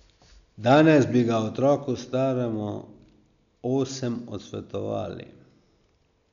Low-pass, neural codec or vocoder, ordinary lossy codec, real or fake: 7.2 kHz; none; none; real